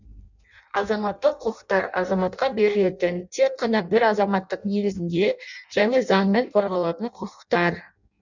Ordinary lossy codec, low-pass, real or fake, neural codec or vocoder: none; 7.2 kHz; fake; codec, 16 kHz in and 24 kHz out, 0.6 kbps, FireRedTTS-2 codec